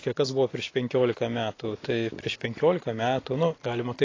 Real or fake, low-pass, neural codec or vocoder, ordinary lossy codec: real; 7.2 kHz; none; AAC, 32 kbps